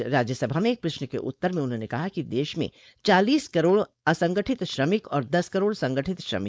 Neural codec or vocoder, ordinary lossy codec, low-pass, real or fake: codec, 16 kHz, 4.8 kbps, FACodec; none; none; fake